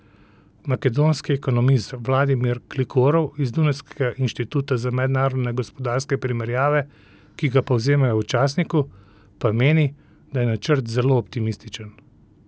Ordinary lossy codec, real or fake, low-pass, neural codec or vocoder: none; real; none; none